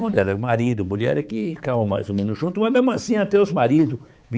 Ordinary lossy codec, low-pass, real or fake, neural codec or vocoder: none; none; fake; codec, 16 kHz, 4 kbps, X-Codec, HuBERT features, trained on balanced general audio